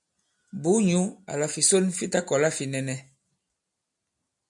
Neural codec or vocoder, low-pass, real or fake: none; 10.8 kHz; real